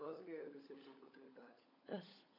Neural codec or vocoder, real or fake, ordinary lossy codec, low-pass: codec, 16 kHz, 16 kbps, FunCodec, trained on LibriTTS, 50 frames a second; fake; AAC, 32 kbps; 5.4 kHz